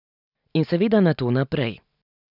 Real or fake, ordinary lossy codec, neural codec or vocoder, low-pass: real; none; none; 5.4 kHz